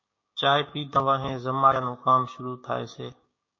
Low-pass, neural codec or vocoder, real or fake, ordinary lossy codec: 7.2 kHz; codec, 16 kHz, 6 kbps, DAC; fake; MP3, 32 kbps